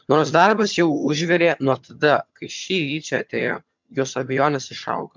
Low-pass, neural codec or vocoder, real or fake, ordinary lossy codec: 7.2 kHz; vocoder, 22.05 kHz, 80 mel bands, HiFi-GAN; fake; MP3, 64 kbps